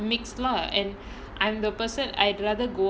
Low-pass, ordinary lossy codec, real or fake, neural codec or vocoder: none; none; real; none